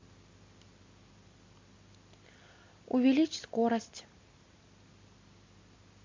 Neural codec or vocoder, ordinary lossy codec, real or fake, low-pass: none; MP3, 48 kbps; real; 7.2 kHz